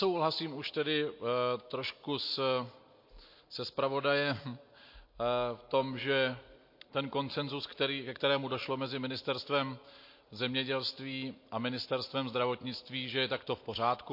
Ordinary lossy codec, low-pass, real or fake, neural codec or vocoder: MP3, 32 kbps; 5.4 kHz; real; none